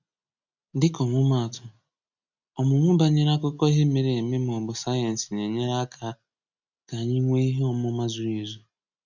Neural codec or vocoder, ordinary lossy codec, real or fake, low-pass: none; none; real; 7.2 kHz